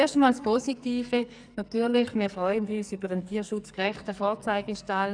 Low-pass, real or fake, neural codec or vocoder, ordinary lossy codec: 9.9 kHz; fake; codec, 44.1 kHz, 2.6 kbps, SNAC; none